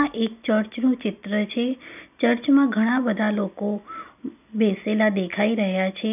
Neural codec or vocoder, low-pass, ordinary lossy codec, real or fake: none; 3.6 kHz; none; real